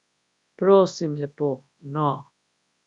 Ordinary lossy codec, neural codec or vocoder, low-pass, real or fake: none; codec, 24 kHz, 0.9 kbps, WavTokenizer, large speech release; 10.8 kHz; fake